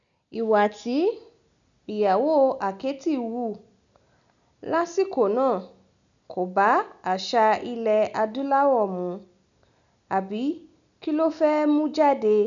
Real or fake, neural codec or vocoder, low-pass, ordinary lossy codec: real; none; 7.2 kHz; none